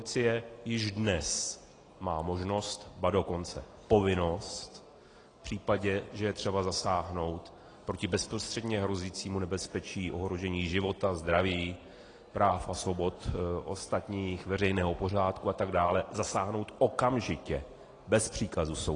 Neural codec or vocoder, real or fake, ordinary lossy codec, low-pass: none; real; AAC, 32 kbps; 9.9 kHz